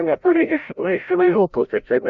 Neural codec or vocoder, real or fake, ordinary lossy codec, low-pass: codec, 16 kHz, 0.5 kbps, FreqCodec, larger model; fake; Opus, 64 kbps; 7.2 kHz